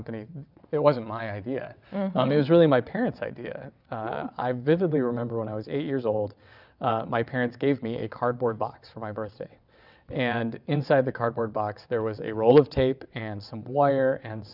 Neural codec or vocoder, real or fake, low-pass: vocoder, 22.05 kHz, 80 mel bands, WaveNeXt; fake; 5.4 kHz